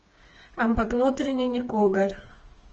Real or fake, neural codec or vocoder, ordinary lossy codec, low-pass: fake; codec, 16 kHz, 4 kbps, FreqCodec, smaller model; Opus, 24 kbps; 7.2 kHz